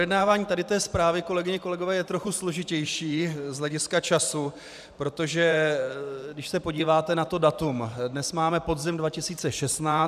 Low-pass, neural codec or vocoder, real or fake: 14.4 kHz; vocoder, 44.1 kHz, 128 mel bands every 512 samples, BigVGAN v2; fake